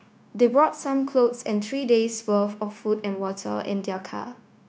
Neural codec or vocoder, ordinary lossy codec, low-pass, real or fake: codec, 16 kHz, 0.9 kbps, LongCat-Audio-Codec; none; none; fake